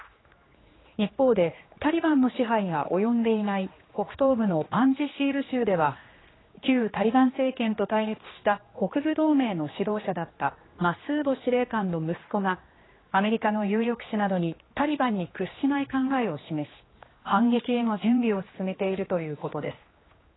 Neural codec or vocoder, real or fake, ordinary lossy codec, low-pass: codec, 16 kHz, 2 kbps, X-Codec, HuBERT features, trained on general audio; fake; AAC, 16 kbps; 7.2 kHz